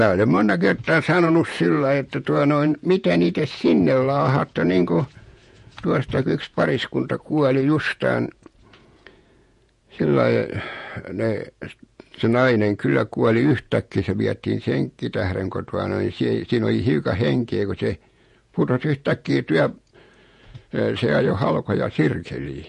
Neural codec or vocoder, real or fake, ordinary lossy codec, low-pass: vocoder, 48 kHz, 128 mel bands, Vocos; fake; MP3, 48 kbps; 14.4 kHz